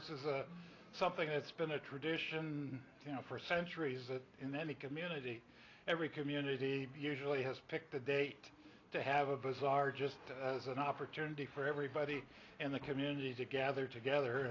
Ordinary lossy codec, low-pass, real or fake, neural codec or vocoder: AAC, 32 kbps; 7.2 kHz; real; none